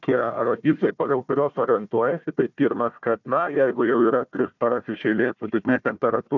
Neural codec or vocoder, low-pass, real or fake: codec, 16 kHz, 1 kbps, FunCodec, trained on Chinese and English, 50 frames a second; 7.2 kHz; fake